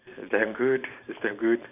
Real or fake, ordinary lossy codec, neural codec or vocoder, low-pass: fake; none; vocoder, 44.1 kHz, 128 mel bands every 512 samples, BigVGAN v2; 3.6 kHz